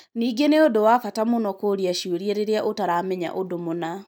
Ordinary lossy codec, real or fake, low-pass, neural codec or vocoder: none; real; none; none